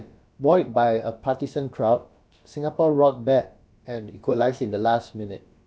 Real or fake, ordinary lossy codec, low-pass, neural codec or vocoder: fake; none; none; codec, 16 kHz, about 1 kbps, DyCAST, with the encoder's durations